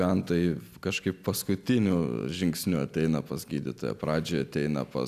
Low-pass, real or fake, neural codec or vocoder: 14.4 kHz; real; none